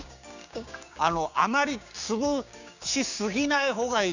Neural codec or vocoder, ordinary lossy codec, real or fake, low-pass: codec, 16 kHz, 6 kbps, DAC; none; fake; 7.2 kHz